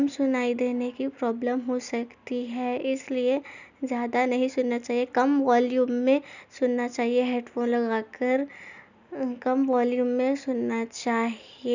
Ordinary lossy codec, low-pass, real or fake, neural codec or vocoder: none; 7.2 kHz; real; none